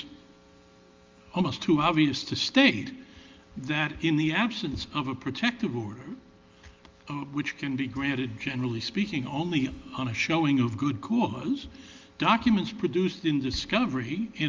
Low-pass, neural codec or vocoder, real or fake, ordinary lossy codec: 7.2 kHz; none; real; Opus, 32 kbps